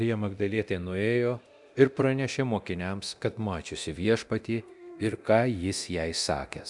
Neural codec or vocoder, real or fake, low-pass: codec, 24 kHz, 0.9 kbps, DualCodec; fake; 10.8 kHz